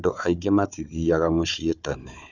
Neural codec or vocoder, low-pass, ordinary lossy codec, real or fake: codec, 16 kHz, 4 kbps, FunCodec, trained on Chinese and English, 50 frames a second; 7.2 kHz; none; fake